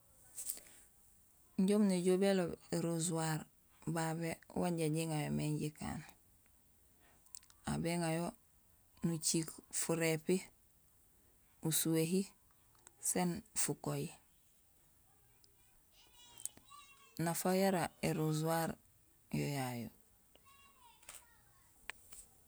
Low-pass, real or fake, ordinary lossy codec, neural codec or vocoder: none; real; none; none